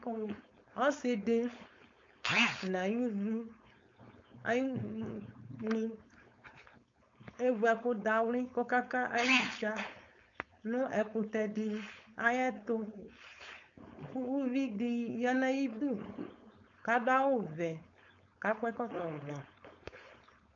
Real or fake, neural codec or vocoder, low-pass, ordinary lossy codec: fake; codec, 16 kHz, 4.8 kbps, FACodec; 7.2 kHz; MP3, 48 kbps